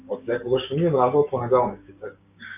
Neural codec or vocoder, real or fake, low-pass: codec, 16 kHz, 6 kbps, DAC; fake; 3.6 kHz